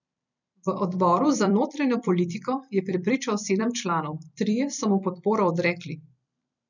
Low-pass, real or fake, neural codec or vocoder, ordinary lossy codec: 7.2 kHz; fake; vocoder, 44.1 kHz, 128 mel bands every 256 samples, BigVGAN v2; none